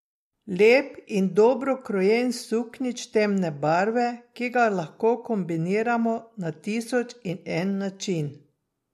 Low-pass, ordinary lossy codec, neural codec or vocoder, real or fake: 14.4 kHz; MP3, 64 kbps; none; real